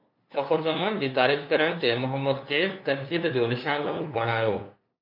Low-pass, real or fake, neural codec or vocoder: 5.4 kHz; fake; codec, 16 kHz, 2 kbps, FunCodec, trained on LibriTTS, 25 frames a second